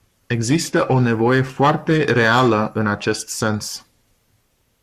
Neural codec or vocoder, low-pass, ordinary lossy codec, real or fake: codec, 44.1 kHz, 7.8 kbps, Pupu-Codec; 14.4 kHz; Opus, 64 kbps; fake